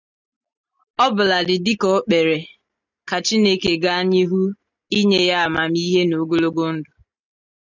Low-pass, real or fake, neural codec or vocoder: 7.2 kHz; real; none